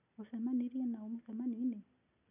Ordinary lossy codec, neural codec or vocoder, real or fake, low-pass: none; none; real; 3.6 kHz